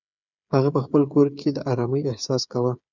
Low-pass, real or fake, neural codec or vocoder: 7.2 kHz; fake; codec, 16 kHz, 8 kbps, FreqCodec, smaller model